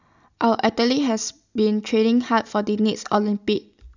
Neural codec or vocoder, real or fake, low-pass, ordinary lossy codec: none; real; 7.2 kHz; none